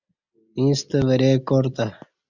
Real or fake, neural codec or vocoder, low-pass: real; none; 7.2 kHz